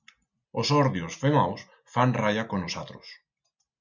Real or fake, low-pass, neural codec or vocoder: real; 7.2 kHz; none